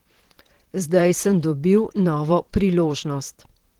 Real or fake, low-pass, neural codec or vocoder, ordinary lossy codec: fake; 19.8 kHz; vocoder, 44.1 kHz, 128 mel bands, Pupu-Vocoder; Opus, 16 kbps